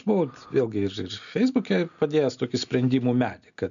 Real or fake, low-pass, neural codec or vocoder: real; 7.2 kHz; none